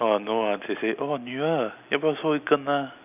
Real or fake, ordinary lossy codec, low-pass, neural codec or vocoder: real; none; 3.6 kHz; none